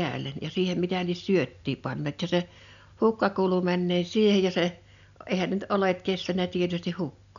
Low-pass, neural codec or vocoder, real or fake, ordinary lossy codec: 7.2 kHz; none; real; none